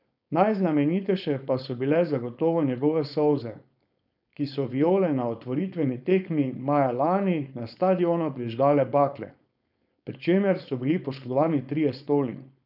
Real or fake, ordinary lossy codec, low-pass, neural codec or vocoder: fake; none; 5.4 kHz; codec, 16 kHz, 4.8 kbps, FACodec